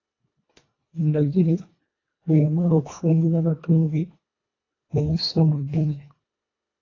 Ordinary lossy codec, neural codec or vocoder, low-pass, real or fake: AAC, 32 kbps; codec, 24 kHz, 1.5 kbps, HILCodec; 7.2 kHz; fake